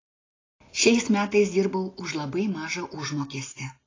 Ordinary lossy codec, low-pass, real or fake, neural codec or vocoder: AAC, 32 kbps; 7.2 kHz; real; none